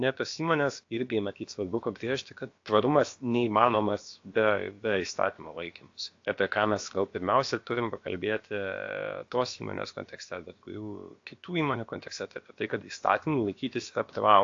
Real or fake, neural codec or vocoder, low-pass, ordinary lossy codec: fake; codec, 16 kHz, about 1 kbps, DyCAST, with the encoder's durations; 7.2 kHz; AAC, 48 kbps